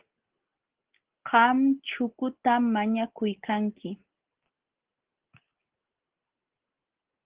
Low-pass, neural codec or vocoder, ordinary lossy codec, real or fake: 3.6 kHz; none; Opus, 16 kbps; real